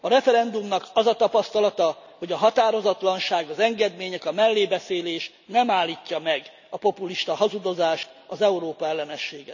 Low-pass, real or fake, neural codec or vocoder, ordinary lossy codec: 7.2 kHz; real; none; none